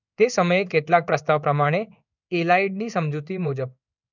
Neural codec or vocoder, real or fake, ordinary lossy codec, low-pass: codec, 16 kHz in and 24 kHz out, 1 kbps, XY-Tokenizer; fake; none; 7.2 kHz